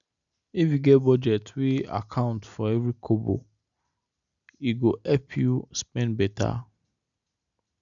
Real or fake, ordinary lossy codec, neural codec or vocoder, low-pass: real; none; none; 7.2 kHz